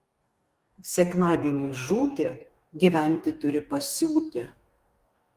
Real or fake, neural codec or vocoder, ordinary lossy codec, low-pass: fake; codec, 44.1 kHz, 2.6 kbps, DAC; Opus, 32 kbps; 14.4 kHz